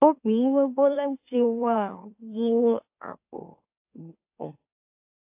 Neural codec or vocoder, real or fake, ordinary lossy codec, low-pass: autoencoder, 44.1 kHz, a latent of 192 numbers a frame, MeloTTS; fake; AAC, 32 kbps; 3.6 kHz